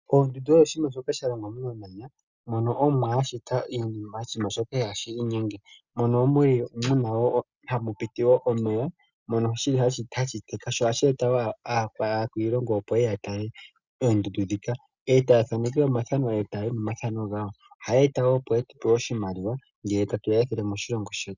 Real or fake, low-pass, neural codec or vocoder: real; 7.2 kHz; none